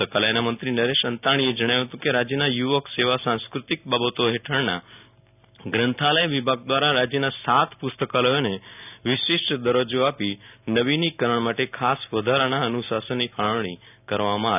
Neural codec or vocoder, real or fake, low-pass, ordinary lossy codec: none; real; 3.6 kHz; none